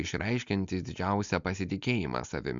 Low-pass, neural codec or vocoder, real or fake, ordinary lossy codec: 7.2 kHz; none; real; MP3, 64 kbps